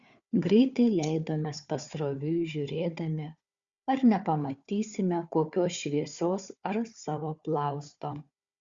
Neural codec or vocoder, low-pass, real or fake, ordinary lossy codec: codec, 16 kHz, 4 kbps, FunCodec, trained on Chinese and English, 50 frames a second; 7.2 kHz; fake; Opus, 64 kbps